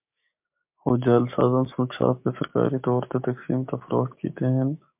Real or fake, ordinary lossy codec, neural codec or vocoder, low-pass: fake; MP3, 32 kbps; codec, 16 kHz, 6 kbps, DAC; 3.6 kHz